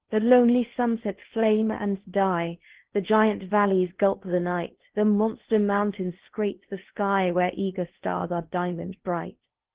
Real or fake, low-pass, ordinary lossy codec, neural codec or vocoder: fake; 3.6 kHz; Opus, 16 kbps; codec, 16 kHz in and 24 kHz out, 0.8 kbps, FocalCodec, streaming, 65536 codes